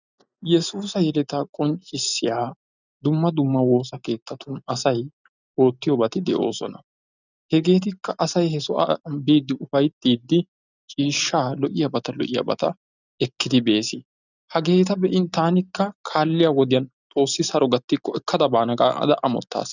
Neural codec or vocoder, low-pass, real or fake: none; 7.2 kHz; real